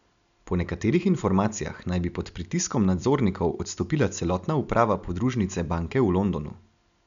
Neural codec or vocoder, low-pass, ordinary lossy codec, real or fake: none; 7.2 kHz; none; real